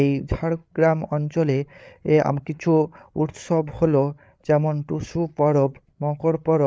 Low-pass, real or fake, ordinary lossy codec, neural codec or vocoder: none; fake; none; codec, 16 kHz, 8 kbps, FreqCodec, larger model